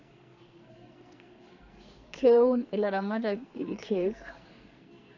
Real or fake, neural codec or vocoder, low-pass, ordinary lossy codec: fake; codec, 44.1 kHz, 2.6 kbps, SNAC; 7.2 kHz; none